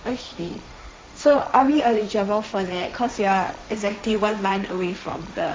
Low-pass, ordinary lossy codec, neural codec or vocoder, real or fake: none; none; codec, 16 kHz, 1.1 kbps, Voila-Tokenizer; fake